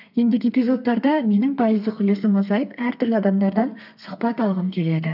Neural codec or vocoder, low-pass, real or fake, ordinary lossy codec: codec, 32 kHz, 1.9 kbps, SNAC; 5.4 kHz; fake; none